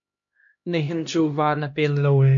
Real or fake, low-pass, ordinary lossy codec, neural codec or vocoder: fake; 7.2 kHz; MP3, 48 kbps; codec, 16 kHz, 1 kbps, X-Codec, HuBERT features, trained on LibriSpeech